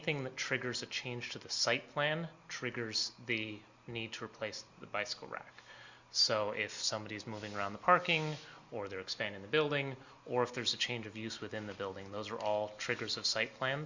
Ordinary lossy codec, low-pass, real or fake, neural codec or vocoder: Opus, 64 kbps; 7.2 kHz; real; none